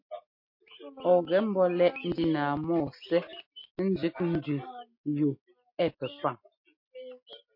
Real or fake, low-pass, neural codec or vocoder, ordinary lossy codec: real; 5.4 kHz; none; MP3, 32 kbps